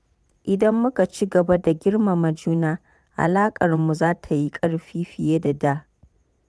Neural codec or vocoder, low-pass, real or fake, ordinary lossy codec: vocoder, 22.05 kHz, 80 mel bands, Vocos; none; fake; none